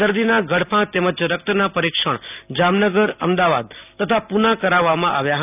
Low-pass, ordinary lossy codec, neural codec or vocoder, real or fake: 3.6 kHz; none; none; real